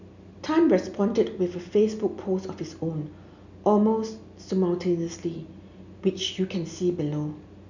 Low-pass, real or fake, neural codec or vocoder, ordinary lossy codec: 7.2 kHz; real; none; none